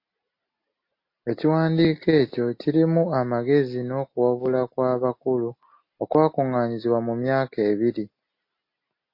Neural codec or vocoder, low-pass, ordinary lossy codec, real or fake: none; 5.4 kHz; MP3, 32 kbps; real